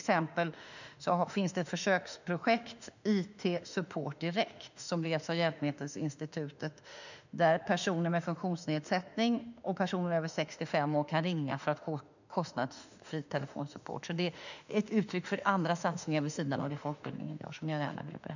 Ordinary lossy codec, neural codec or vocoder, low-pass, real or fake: none; autoencoder, 48 kHz, 32 numbers a frame, DAC-VAE, trained on Japanese speech; 7.2 kHz; fake